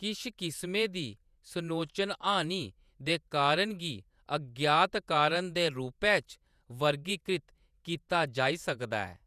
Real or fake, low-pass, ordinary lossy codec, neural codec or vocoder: fake; 14.4 kHz; none; vocoder, 48 kHz, 128 mel bands, Vocos